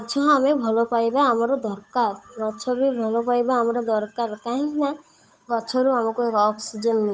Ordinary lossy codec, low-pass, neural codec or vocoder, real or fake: none; none; codec, 16 kHz, 8 kbps, FunCodec, trained on Chinese and English, 25 frames a second; fake